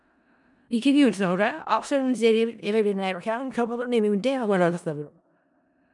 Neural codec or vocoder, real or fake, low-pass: codec, 16 kHz in and 24 kHz out, 0.4 kbps, LongCat-Audio-Codec, four codebook decoder; fake; 10.8 kHz